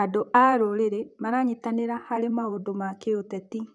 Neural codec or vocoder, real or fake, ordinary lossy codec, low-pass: vocoder, 44.1 kHz, 128 mel bands, Pupu-Vocoder; fake; none; 10.8 kHz